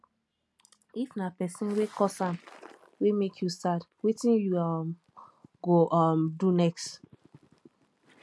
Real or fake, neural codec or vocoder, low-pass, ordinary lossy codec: real; none; none; none